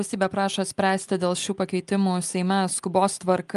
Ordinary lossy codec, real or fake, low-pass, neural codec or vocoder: Opus, 32 kbps; real; 10.8 kHz; none